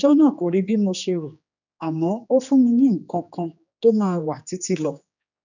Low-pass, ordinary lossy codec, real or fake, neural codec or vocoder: 7.2 kHz; none; fake; codec, 16 kHz, 2 kbps, X-Codec, HuBERT features, trained on general audio